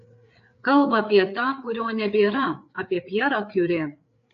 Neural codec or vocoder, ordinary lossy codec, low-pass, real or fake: codec, 16 kHz, 4 kbps, FreqCodec, larger model; AAC, 48 kbps; 7.2 kHz; fake